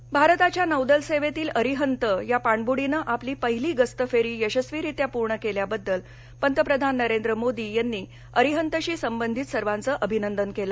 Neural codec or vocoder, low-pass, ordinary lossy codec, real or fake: none; none; none; real